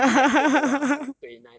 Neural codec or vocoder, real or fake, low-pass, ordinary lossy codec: none; real; none; none